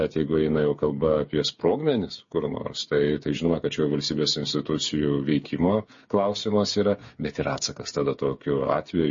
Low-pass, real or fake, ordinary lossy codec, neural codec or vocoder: 7.2 kHz; fake; MP3, 32 kbps; codec, 16 kHz, 8 kbps, FreqCodec, smaller model